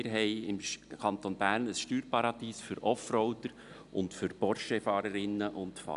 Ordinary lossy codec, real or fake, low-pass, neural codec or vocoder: none; real; 10.8 kHz; none